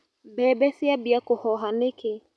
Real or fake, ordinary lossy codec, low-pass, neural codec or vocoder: real; none; none; none